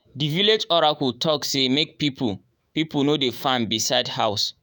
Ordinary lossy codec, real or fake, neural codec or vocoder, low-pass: none; fake; autoencoder, 48 kHz, 128 numbers a frame, DAC-VAE, trained on Japanese speech; none